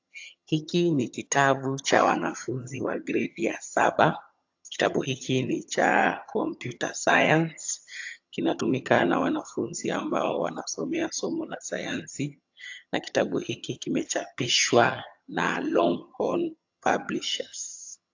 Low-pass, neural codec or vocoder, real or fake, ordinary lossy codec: 7.2 kHz; vocoder, 22.05 kHz, 80 mel bands, HiFi-GAN; fake; AAC, 48 kbps